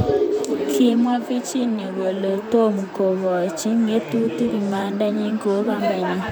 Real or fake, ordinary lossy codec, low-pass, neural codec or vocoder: fake; none; none; vocoder, 44.1 kHz, 128 mel bands, Pupu-Vocoder